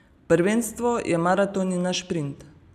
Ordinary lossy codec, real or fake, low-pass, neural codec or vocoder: none; real; 14.4 kHz; none